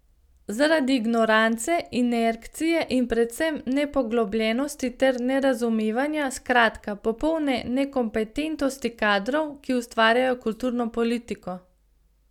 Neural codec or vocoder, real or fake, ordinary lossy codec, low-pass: none; real; none; 19.8 kHz